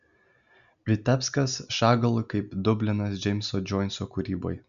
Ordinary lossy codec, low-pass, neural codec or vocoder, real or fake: MP3, 96 kbps; 7.2 kHz; none; real